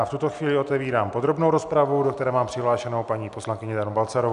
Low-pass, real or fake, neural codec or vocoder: 10.8 kHz; real; none